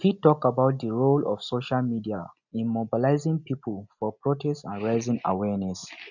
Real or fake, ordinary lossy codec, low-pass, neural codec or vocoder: real; none; 7.2 kHz; none